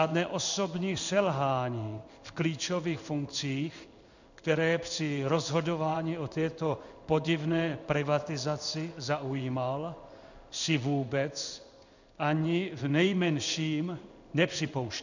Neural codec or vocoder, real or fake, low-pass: codec, 16 kHz in and 24 kHz out, 1 kbps, XY-Tokenizer; fake; 7.2 kHz